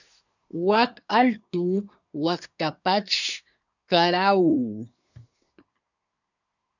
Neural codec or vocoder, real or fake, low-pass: codec, 24 kHz, 1 kbps, SNAC; fake; 7.2 kHz